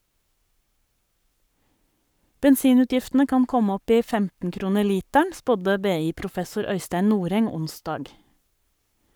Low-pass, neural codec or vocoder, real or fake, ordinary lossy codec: none; codec, 44.1 kHz, 7.8 kbps, Pupu-Codec; fake; none